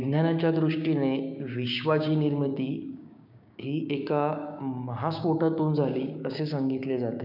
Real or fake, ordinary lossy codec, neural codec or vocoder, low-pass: fake; none; codec, 16 kHz, 6 kbps, DAC; 5.4 kHz